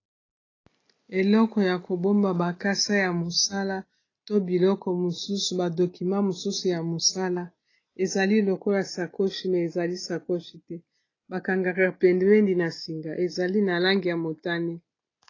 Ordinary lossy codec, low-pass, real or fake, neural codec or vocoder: AAC, 32 kbps; 7.2 kHz; real; none